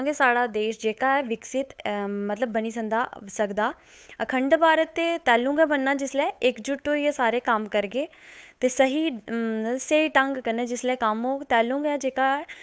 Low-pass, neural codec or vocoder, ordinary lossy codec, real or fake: none; codec, 16 kHz, 8 kbps, FunCodec, trained on Chinese and English, 25 frames a second; none; fake